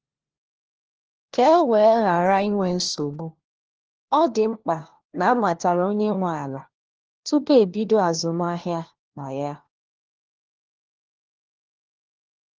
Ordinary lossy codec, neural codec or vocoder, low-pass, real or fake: Opus, 16 kbps; codec, 16 kHz, 1 kbps, FunCodec, trained on LibriTTS, 50 frames a second; 7.2 kHz; fake